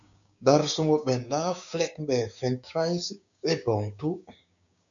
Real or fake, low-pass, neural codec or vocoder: fake; 7.2 kHz; codec, 16 kHz, 6 kbps, DAC